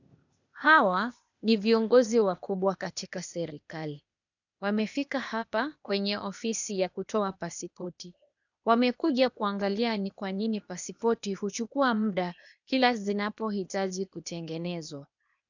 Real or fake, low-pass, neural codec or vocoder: fake; 7.2 kHz; codec, 16 kHz, 0.8 kbps, ZipCodec